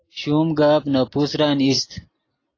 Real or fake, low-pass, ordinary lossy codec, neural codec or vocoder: real; 7.2 kHz; AAC, 32 kbps; none